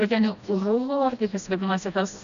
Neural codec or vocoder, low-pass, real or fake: codec, 16 kHz, 1 kbps, FreqCodec, smaller model; 7.2 kHz; fake